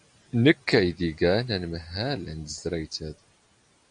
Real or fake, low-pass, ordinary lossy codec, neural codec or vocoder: real; 9.9 kHz; AAC, 64 kbps; none